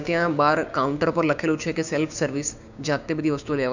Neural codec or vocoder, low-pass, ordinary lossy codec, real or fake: codec, 16 kHz, 6 kbps, DAC; 7.2 kHz; none; fake